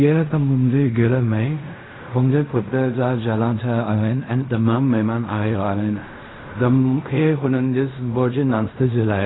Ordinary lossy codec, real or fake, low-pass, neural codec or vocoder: AAC, 16 kbps; fake; 7.2 kHz; codec, 16 kHz in and 24 kHz out, 0.4 kbps, LongCat-Audio-Codec, fine tuned four codebook decoder